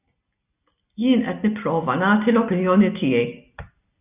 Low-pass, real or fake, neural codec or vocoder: 3.6 kHz; real; none